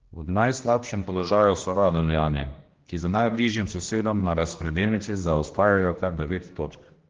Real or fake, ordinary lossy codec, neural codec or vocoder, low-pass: fake; Opus, 16 kbps; codec, 16 kHz, 1 kbps, X-Codec, HuBERT features, trained on general audio; 7.2 kHz